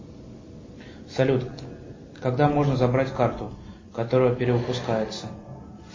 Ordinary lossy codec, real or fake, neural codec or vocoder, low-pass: MP3, 32 kbps; real; none; 7.2 kHz